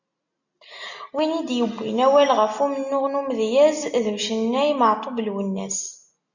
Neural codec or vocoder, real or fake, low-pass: none; real; 7.2 kHz